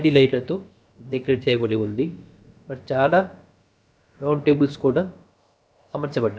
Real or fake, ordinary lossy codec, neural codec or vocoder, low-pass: fake; none; codec, 16 kHz, about 1 kbps, DyCAST, with the encoder's durations; none